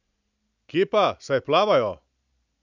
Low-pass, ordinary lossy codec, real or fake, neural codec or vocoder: 7.2 kHz; none; real; none